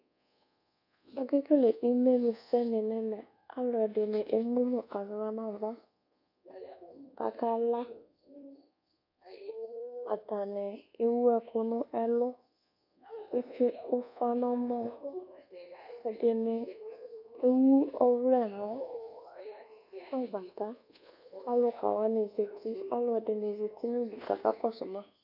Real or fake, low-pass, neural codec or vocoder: fake; 5.4 kHz; codec, 24 kHz, 1.2 kbps, DualCodec